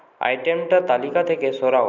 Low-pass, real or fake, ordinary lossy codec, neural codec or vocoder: 7.2 kHz; real; none; none